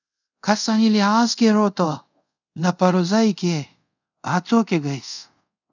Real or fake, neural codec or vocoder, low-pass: fake; codec, 24 kHz, 0.5 kbps, DualCodec; 7.2 kHz